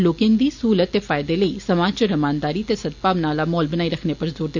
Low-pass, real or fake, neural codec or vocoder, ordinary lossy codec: 7.2 kHz; real; none; none